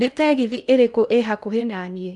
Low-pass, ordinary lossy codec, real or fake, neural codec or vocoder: 10.8 kHz; none; fake; codec, 16 kHz in and 24 kHz out, 0.8 kbps, FocalCodec, streaming, 65536 codes